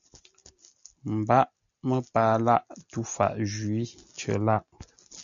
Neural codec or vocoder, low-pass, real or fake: none; 7.2 kHz; real